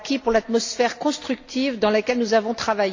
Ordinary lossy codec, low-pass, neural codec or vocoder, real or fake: AAC, 48 kbps; 7.2 kHz; none; real